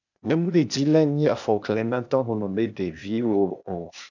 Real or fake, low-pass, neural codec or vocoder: fake; 7.2 kHz; codec, 16 kHz, 0.8 kbps, ZipCodec